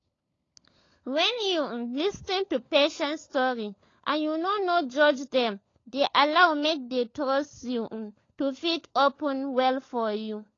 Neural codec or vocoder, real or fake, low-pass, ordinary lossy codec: codec, 16 kHz, 16 kbps, FunCodec, trained on LibriTTS, 50 frames a second; fake; 7.2 kHz; AAC, 32 kbps